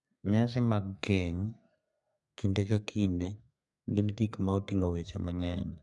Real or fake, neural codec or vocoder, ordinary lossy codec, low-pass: fake; codec, 32 kHz, 1.9 kbps, SNAC; none; 10.8 kHz